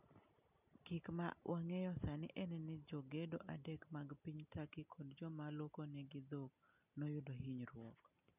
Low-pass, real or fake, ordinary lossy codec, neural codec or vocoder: 3.6 kHz; real; none; none